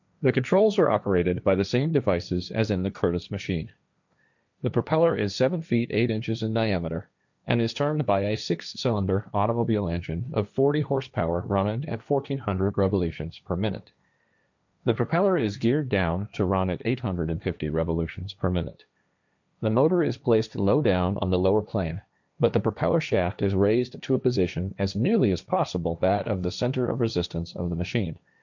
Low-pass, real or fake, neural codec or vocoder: 7.2 kHz; fake; codec, 16 kHz, 1.1 kbps, Voila-Tokenizer